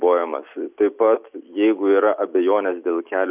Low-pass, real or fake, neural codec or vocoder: 3.6 kHz; real; none